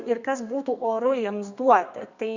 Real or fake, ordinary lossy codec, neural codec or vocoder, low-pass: fake; Opus, 64 kbps; codec, 32 kHz, 1.9 kbps, SNAC; 7.2 kHz